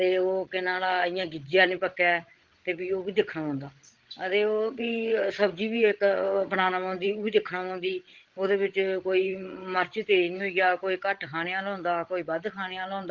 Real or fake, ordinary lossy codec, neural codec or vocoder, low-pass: fake; Opus, 16 kbps; codec, 16 kHz, 16 kbps, FreqCodec, larger model; 7.2 kHz